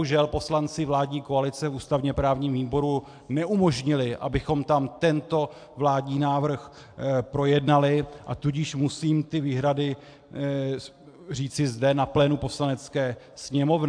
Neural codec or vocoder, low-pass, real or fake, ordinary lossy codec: none; 9.9 kHz; real; AAC, 64 kbps